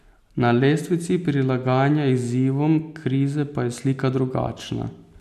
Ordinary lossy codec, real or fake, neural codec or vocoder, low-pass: none; real; none; 14.4 kHz